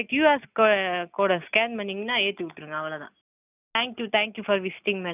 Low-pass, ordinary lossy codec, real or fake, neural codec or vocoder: 3.6 kHz; none; real; none